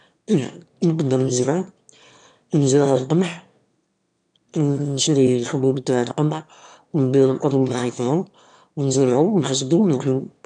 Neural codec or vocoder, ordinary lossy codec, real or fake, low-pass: autoencoder, 22.05 kHz, a latent of 192 numbers a frame, VITS, trained on one speaker; none; fake; 9.9 kHz